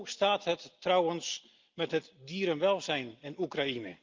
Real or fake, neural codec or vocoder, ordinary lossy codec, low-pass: real; none; Opus, 32 kbps; 7.2 kHz